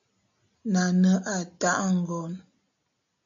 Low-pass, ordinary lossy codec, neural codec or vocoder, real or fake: 7.2 kHz; AAC, 64 kbps; none; real